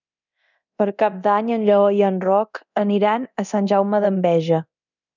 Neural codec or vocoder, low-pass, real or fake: codec, 24 kHz, 0.9 kbps, DualCodec; 7.2 kHz; fake